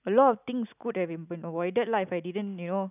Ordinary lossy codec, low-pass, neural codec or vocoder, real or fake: none; 3.6 kHz; none; real